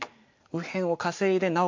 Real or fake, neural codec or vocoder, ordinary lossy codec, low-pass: real; none; MP3, 48 kbps; 7.2 kHz